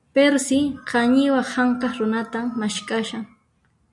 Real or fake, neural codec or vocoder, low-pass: real; none; 10.8 kHz